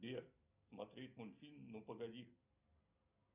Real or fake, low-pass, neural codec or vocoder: real; 3.6 kHz; none